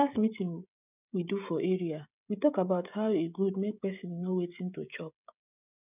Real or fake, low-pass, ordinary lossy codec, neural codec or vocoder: fake; 3.6 kHz; none; codec, 16 kHz, 16 kbps, FreqCodec, smaller model